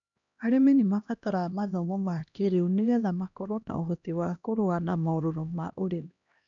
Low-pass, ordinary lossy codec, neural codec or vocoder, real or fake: 7.2 kHz; none; codec, 16 kHz, 1 kbps, X-Codec, HuBERT features, trained on LibriSpeech; fake